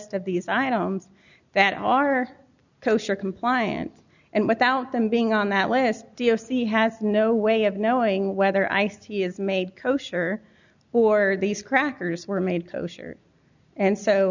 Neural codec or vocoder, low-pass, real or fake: none; 7.2 kHz; real